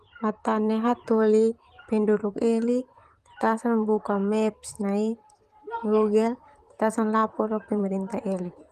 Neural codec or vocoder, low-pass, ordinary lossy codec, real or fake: vocoder, 44.1 kHz, 128 mel bands, Pupu-Vocoder; 14.4 kHz; Opus, 24 kbps; fake